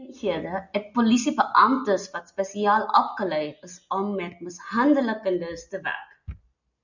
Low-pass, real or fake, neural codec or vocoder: 7.2 kHz; real; none